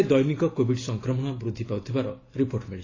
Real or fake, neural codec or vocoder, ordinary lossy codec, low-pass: real; none; AAC, 32 kbps; 7.2 kHz